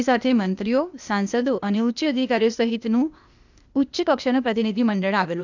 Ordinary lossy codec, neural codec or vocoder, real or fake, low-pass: none; codec, 16 kHz, 0.8 kbps, ZipCodec; fake; 7.2 kHz